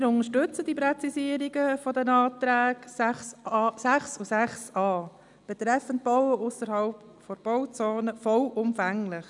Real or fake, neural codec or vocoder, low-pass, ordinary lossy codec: real; none; 10.8 kHz; none